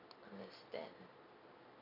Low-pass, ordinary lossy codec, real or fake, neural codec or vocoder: 5.4 kHz; none; real; none